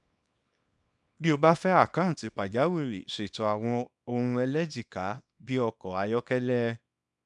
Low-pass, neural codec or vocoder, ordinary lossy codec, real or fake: 10.8 kHz; codec, 24 kHz, 0.9 kbps, WavTokenizer, small release; none; fake